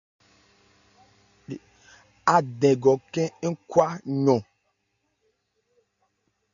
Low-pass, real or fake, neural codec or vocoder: 7.2 kHz; real; none